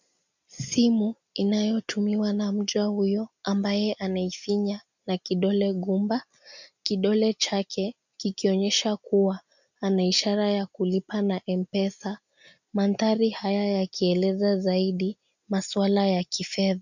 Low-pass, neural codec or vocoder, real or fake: 7.2 kHz; none; real